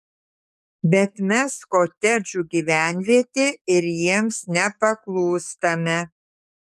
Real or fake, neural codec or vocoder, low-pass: fake; codec, 44.1 kHz, 7.8 kbps, DAC; 14.4 kHz